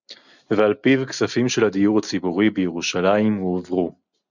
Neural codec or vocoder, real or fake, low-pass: none; real; 7.2 kHz